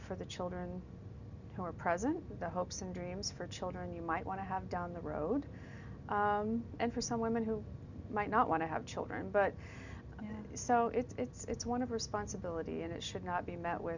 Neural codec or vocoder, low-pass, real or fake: none; 7.2 kHz; real